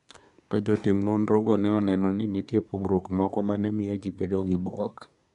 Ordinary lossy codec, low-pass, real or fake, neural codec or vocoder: none; 10.8 kHz; fake; codec, 24 kHz, 1 kbps, SNAC